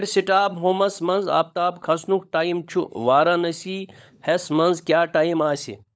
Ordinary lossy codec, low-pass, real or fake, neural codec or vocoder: none; none; fake; codec, 16 kHz, 16 kbps, FunCodec, trained on LibriTTS, 50 frames a second